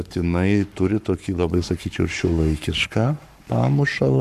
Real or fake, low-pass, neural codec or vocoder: fake; 14.4 kHz; codec, 44.1 kHz, 7.8 kbps, Pupu-Codec